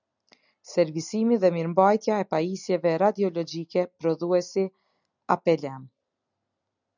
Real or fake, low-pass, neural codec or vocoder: real; 7.2 kHz; none